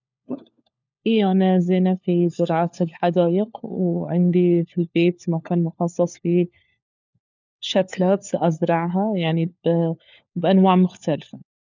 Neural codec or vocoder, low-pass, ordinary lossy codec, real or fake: codec, 16 kHz, 4 kbps, FunCodec, trained on LibriTTS, 50 frames a second; 7.2 kHz; none; fake